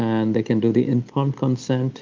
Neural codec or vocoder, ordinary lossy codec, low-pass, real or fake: none; Opus, 32 kbps; 7.2 kHz; real